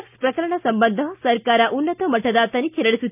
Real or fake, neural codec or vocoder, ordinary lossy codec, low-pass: real; none; none; 3.6 kHz